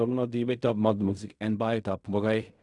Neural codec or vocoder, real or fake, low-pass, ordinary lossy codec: codec, 16 kHz in and 24 kHz out, 0.4 kbps, LongCat-Audio-Codec, fine tuned four codebook decoder; fake; 10.8 kHz; none